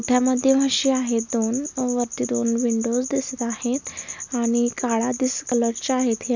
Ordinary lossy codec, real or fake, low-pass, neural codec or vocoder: none; real; 7.2 kHz; none